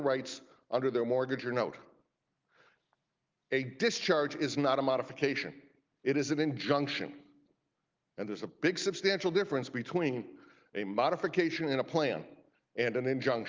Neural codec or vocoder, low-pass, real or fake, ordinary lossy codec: none; 7.2 kHz; real; Opus, 32 kbps